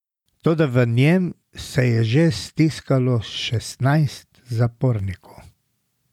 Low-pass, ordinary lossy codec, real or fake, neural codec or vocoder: 19.8 kHz; none; real; none